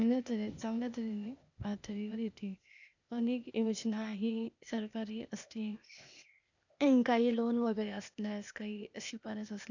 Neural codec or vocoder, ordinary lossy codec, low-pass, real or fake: codec, 16 kHz, 0.8 kbps, ZipCodec; none; 7.2 kHz; fake